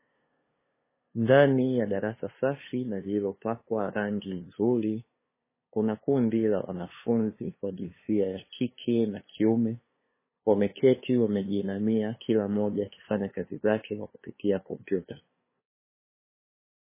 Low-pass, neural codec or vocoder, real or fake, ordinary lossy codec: 3.6 kHz; codec, 16 kHz, 2 kbps, FunCodec, trained on LibriTTS, 25 frames a second; fake; MP3, 16 kbps